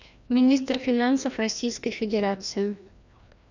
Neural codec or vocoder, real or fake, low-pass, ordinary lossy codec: codec, 16 kHz, 1 kbps, FreqCodec, larger model; fake; 7.2 kHz; none